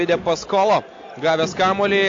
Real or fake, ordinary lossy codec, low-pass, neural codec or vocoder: real; AAC, 48 kbps; 7.2 kHz; none